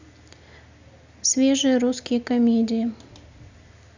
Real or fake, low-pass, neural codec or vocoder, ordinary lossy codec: real; 7.2 kHz; none; Opus, 64 kbps